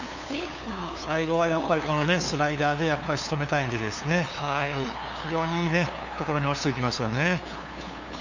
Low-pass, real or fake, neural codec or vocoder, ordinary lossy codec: 7.2 kHz; fake; codec, 16 kHz, 2 kbps, FunCodec, trained on LibriTTS, 25 frames a second; Opus, 64 kbps